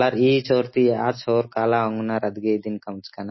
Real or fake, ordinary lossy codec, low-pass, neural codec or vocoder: real; MP3, 24 kbps; 7.2 kHz; none